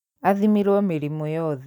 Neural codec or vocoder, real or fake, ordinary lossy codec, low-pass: none; real; none; 19.8 kHz